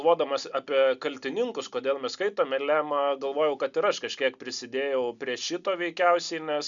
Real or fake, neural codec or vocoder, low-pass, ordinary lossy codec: real; none; 7.2 kHz; MP3, 96 kbps